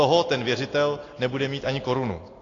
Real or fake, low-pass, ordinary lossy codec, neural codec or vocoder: real; 7.2 kHz; AAC, 32 kbps; none